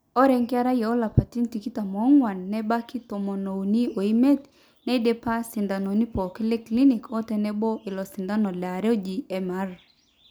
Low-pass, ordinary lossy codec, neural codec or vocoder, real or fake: none; none; none; real